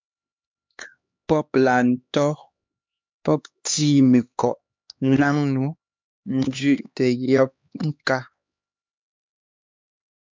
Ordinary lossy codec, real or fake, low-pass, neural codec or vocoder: MP3, 64 kbps; fake; 7.2 kHz; codec, 16 kHz, 2 kbps, X-Codec, HuBERT features, trained on LibriSpeech